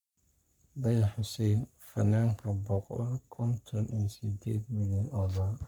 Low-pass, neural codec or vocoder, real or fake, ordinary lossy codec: none; codec, 44.1 kHz, 3.4 kbps, Pupu-Codec; fake; none